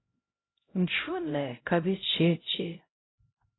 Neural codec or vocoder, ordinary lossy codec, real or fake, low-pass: codec, 16 kHz, 0.5 kbps, X-Codec, HuBERT features, trained on LibriSpeech; AAC, 16 kbps; fake; 7.2 kHz